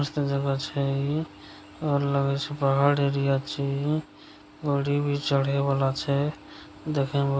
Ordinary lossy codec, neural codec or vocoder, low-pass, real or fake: none; none; none; real